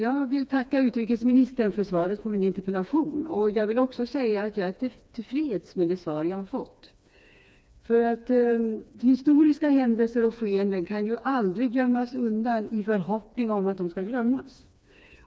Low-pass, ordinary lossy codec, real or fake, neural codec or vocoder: none; none; fake; codec, 16 kHz, 2 kbps, FreqCodec, smaller model